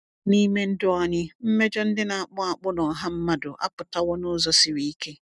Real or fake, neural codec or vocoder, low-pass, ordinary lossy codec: real; none; 10.8 kHz; none